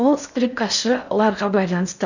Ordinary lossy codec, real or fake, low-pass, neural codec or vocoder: none; fake; 7.2 kHz; codec, 16 kHz in and 24 kHz out, 0.6 kbps, FocalCodec, streaming, 4096 codes